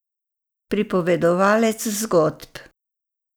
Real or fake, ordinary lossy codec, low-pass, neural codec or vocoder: real; none; none; none